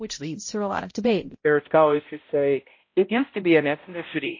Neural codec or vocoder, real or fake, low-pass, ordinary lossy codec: codec, 16 kHz, 0.5 kbps, X-Codec, HuBERT features, trained on balanced general audio; fake; 7.2 kHz; MP3, 32 kbps